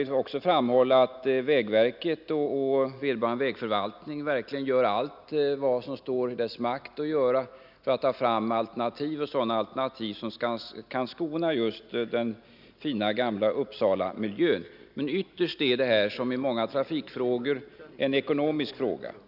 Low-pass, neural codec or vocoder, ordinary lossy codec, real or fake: 5.4 kHz; none; none; real